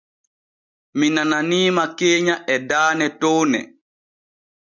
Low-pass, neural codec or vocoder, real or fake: 7.2 kHz; none; real